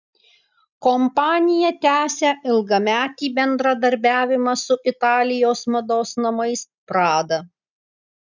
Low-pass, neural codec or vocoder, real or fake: 7.2 kHz; none; real